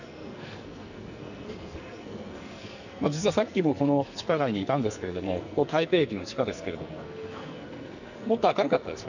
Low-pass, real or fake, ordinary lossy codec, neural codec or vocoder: 7.2 kHz; fake; none; codec, 44.1 kHz, 2.6 kbps, SNAC